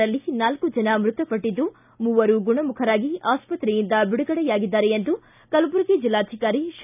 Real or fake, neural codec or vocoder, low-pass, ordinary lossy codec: real; none; 3.6 kHz; none